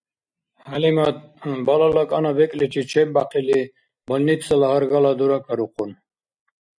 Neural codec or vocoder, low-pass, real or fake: none; 9.9 kHz; real